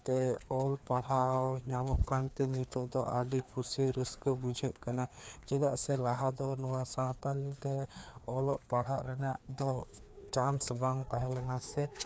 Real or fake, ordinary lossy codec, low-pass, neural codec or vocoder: fake; none; none; codec, 16 kHz, 2 kbps, FreqCodec, larger model